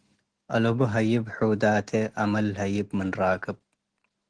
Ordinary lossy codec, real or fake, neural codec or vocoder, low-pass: Opus, 16 kbps; real; none; 9.9 kHz